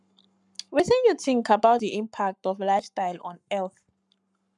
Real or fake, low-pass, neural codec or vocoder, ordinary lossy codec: fake; 10.8 kHz; codec, 44.1 kHz, 7.8 kbps, Pupu-Codec; none